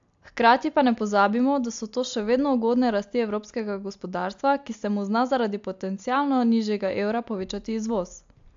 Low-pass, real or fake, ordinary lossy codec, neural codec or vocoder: 7.2 kHz; real; AAC, 64 kbps; none